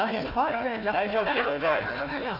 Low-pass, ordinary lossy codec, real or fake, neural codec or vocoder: 5.4 kHz; none; fake; codec, 16 kHz, 1 kbps, FunCodec, trained on Chinese and English, 50 frames a second